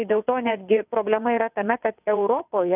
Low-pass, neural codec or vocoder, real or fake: 3.6 kHz; vocoder, 22.05 kHz, 80 mel bands, WaveNeXt; fake